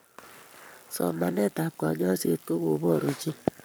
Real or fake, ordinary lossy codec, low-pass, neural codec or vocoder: fake; none; none; codec, 44.1 kHz, 7.8 kbps, Pupu-Codec